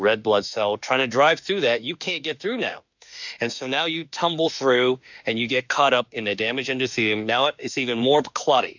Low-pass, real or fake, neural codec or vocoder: 7.2 kHz; fake; autoencoder, 48 kHz, 32 numbers a frame, DAC-VAE, trained on Japanese speech